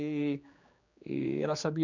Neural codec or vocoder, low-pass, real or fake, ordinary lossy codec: codec, 16 kHz, 4 kbps, X-Codec, HuBERT features, trained on general audio; 7.2 kHz; fake; none